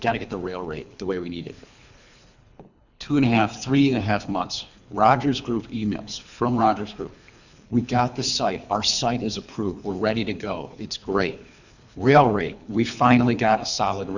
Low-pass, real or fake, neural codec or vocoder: 7.2 kHz; fake; codec, 24 kHz, 3 kbps, HILCodec